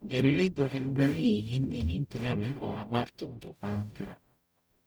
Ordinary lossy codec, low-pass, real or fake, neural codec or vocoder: none; none; fake; codec, 44.1 kHz, 0.9 kbps, DAC